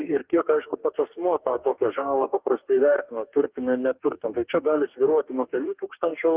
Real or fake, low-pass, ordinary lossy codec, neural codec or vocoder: fake; 3.6 kHz; Opus, 32 kbps; codec, 44.1 kHz, 2.6 kbps, DAC